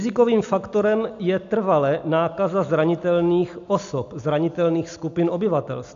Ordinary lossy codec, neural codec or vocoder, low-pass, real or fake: AAC, 64 kbps; none; 7.2 kHz; real